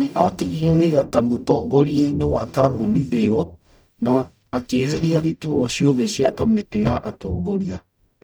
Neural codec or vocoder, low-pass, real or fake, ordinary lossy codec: codec, 44.1 kHz, 0.9 kbps, DAC; none; fake; none